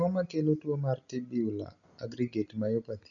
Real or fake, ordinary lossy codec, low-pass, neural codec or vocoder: real; none; 7.2 kHz; none